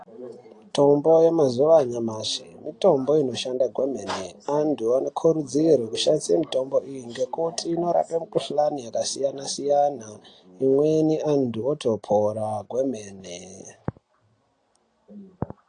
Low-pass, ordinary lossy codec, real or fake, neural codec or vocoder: 9.9 kHz; AAC, 48 kbps; real; none